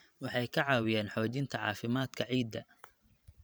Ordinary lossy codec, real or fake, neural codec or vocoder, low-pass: none; fake; vocoder, 44.1 kHz, 128 mel bands every 512 samples, BigVGAN v2; none